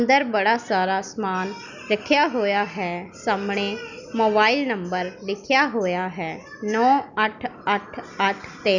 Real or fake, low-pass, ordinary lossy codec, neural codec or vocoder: real; 7.2 kHz; none; none